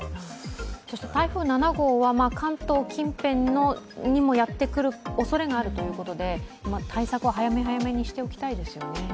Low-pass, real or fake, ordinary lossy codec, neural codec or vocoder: none; real; none; none